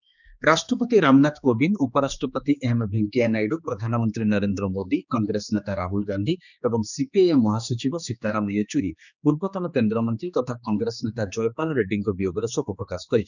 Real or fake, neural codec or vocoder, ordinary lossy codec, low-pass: fake; codec, 16 kHz, 2 kbps, X-Codec, HuBERT features, trained on general audio; none; 7.2 kHz